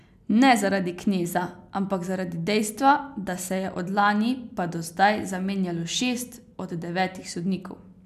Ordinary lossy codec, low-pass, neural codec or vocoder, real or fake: none; 14.4 kHz; none; real